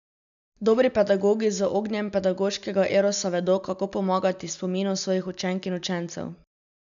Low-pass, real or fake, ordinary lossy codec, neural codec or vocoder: 7.2 kHz; real; none; none